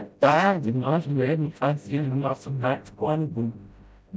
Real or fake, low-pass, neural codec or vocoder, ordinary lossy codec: fake; none; codec, 16 kHz, 0.5 kbps, FreqCodec, smaller model; none